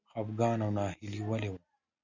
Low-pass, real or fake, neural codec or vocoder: 7.2 kHz; real; none